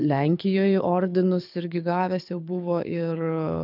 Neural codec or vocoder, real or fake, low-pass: codec, 24 kHz, 6 kbps, HILCodec; fake; 5.4 kHz